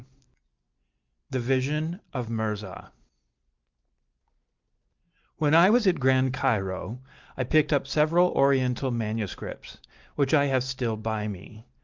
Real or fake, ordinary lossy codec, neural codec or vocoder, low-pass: real; Opus, 32 kbps; none; 7.2 kHz